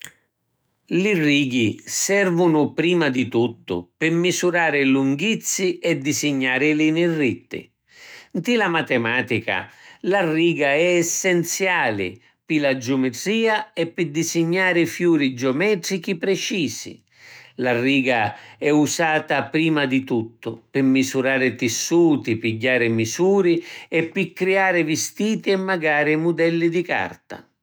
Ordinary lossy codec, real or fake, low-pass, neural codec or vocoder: none; fake; none; autoencoder, 48 kHz, 128 numbers a frame, DAC-VAE, trained on Japanese speech